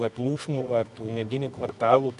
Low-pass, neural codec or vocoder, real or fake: 10.8 kHz; codec, 24 kHz, 0.9 kbps, WavTokenizer, medium music audio release; fake